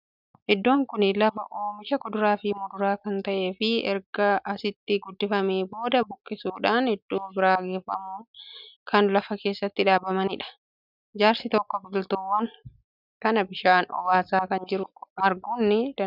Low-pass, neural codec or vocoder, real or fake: 5.4 kHz; none; real